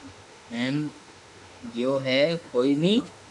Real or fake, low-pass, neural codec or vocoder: fake; 10.8 kHz; autoencoder, 48 kHz, 32 numbers a frame, DAC-VAE, trained on Japanese speech